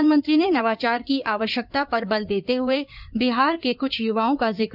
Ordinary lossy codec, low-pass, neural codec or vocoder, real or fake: none; 5.4 kHz; codec, 16 kHz in and 24 kHz out, 2.2 kbps, FireRedTTS-2 codec; fake